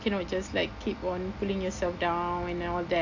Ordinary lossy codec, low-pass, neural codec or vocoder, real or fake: AAC, 48 kbps; 7.2 kHz; none; real